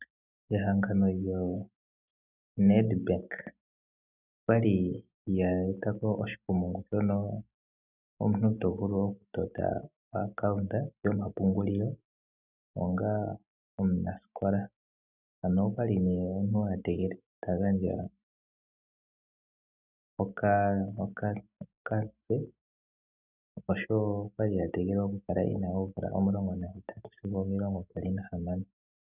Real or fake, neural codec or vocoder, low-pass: real; none; 3.6 kHz